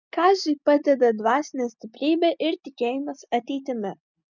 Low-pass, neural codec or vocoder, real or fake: 7.2 kHz; none; real